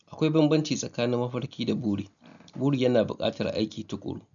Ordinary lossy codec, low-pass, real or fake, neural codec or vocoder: none; 7.2 kHz; real; none